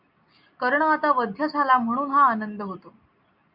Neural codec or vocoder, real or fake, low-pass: none; real; 5.4 kHz